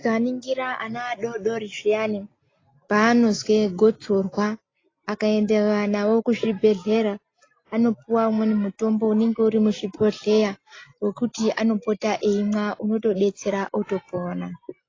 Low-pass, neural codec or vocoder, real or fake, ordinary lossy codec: 7.2 kHz; none; real; AAC, 32 kbps